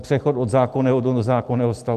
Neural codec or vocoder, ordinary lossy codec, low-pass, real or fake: none; Opus, 32 kbps; 14.4 kHz; real